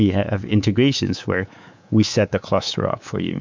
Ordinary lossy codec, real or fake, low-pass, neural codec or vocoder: MP3, 64 kbps; fake; 7.2 kHz; codec, 24 kHz, 3.1 kbps, DualCodec